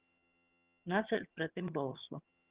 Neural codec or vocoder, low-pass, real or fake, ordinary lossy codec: vocoder, 22.05 kHz, 80 mel bands, HiFi-GAN; 3.6 kHz; fake; Opus, 32 kbps